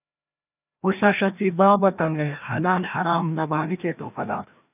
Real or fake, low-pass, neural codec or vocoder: fake; 3.6 kHz; codec, 16 kHz, 1 kbps, FreqCodec, larger model